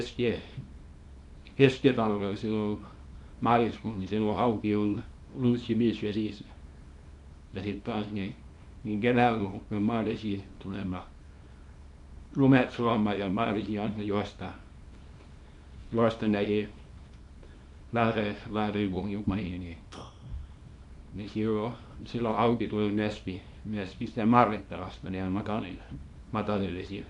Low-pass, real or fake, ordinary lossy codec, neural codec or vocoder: 10.8 kHz; fake; MP3, 64 kbps; codec, 24 kHz, 0.9 kbps, WavTokenizer, small release